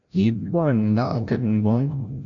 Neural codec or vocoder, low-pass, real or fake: codec, 16 kHz, 0.5 kbps, FreqCodec, larger model; 7.2 kHz; fake